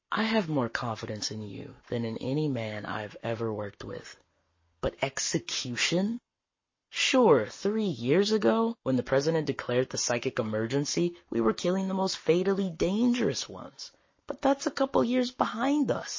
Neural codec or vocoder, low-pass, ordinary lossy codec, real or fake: codec, 44.1 kHz, 7.8 kbps, Pupu-Codec; 7.2 kHz; MP3, 32 kbps; fake